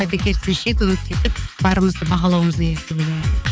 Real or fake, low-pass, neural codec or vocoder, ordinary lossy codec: fake; none; codec, 16 kHz, 4 kbps, X-Codec, HuBERT features, trained on balanced general audio; none